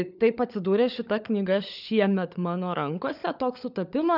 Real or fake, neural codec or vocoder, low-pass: fake; codec, 16 kHz, 16 kbps, FunCodec, trained on LibriTTS, 50 frames a second; 5.4 kHz